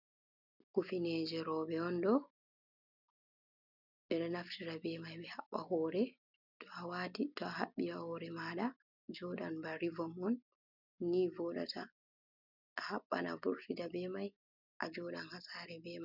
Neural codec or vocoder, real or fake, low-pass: none; real; 5.4 kHz